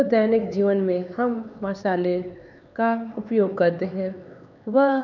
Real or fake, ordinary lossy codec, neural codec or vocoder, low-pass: fake; none; codec, 16 kHz, 4 kbps, X-Codec, HuBERT features, trained on LibriSpeech; 7.2 kHz